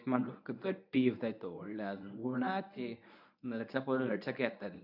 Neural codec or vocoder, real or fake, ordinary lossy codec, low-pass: codec, 24 kHz, 0.9 kbps, WavTokenizer, medium speech release version 1; fake; none; 5.4 kHz